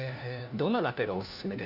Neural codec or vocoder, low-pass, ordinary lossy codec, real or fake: codec, 16 kHz, 1 kbps, FunCodec, trained on LibriTTS, 50 frames a second; 5.4 kHz; none; fake